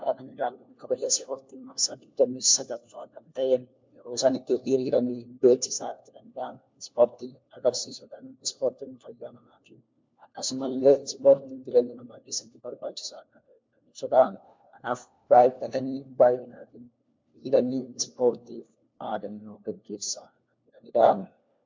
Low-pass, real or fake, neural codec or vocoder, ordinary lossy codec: 7.2 kHz; fake; codec, 16 kHz, 1 kbps, FunCodec, trained on LibriTTS, 50 frames a second; MP3, 64 kbps